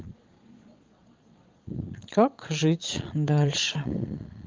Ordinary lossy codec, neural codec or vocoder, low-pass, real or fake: Opus, 32 kbps; vocoder, 22.05 kHz, 80 mel bands, Vocos; 7.2 kHz; fake